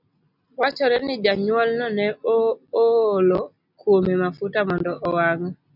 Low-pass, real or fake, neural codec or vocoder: 5.4 kHz; real; none